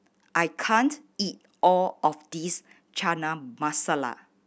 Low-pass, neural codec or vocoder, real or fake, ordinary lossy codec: none; none; real; none